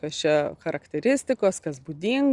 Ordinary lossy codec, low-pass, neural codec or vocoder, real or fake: Opus, 64 kbps; 10.8 kHz; none; real